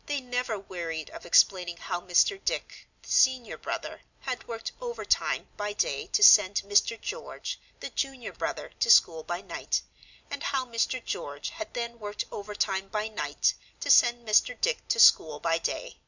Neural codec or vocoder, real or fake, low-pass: none; real; 7.2 kHz